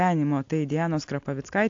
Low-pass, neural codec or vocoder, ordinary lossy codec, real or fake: 7.2 kHz; none; AAC, 48 kbps; real